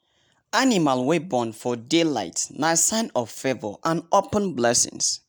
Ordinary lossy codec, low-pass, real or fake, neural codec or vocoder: none; none; real; none